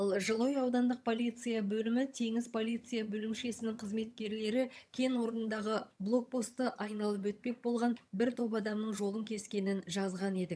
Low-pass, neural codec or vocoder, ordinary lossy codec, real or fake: none; vocoder, 22.05 kHz, 80 mel bands, HiFi-GAN; none; fake